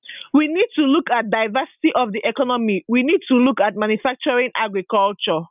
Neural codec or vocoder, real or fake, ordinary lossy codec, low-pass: none; real; none; 3.6 kHz